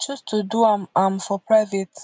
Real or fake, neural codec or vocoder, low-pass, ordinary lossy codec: real; none; none; none